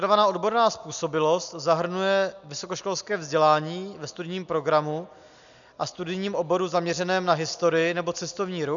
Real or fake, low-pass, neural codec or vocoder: real; 7.2 kHz; none